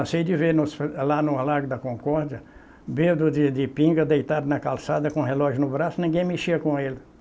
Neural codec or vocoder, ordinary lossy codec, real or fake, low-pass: none; none; real; none